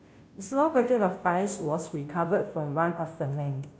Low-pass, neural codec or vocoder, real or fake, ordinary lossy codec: none; codec, 16 kHz, 0.5 kbps, FunCodec, trained on Chinese and English, 25 frames a second; fake; none